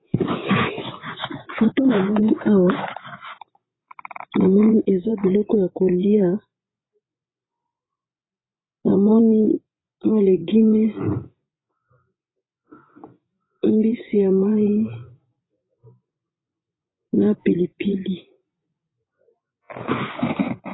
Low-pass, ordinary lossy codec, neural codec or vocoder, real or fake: 7.2 kHz; AAC, 16 kbps; vocoder, 24 kHz, 100 mel bands, Vocos; fake